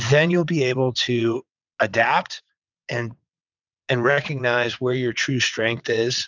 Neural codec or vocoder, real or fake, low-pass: vocoder, 22.05 kHz, 80 mel bands, WaveNeXt; fake; 7.2 kHz